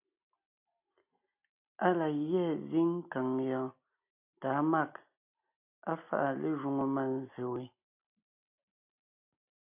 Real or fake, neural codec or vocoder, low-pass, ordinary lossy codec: real; none; 3.6 kHz; AAC, 32 kbps